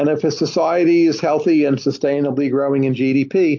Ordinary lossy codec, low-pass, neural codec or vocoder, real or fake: AAC, 48 kbps; 7.2 kHz; none; real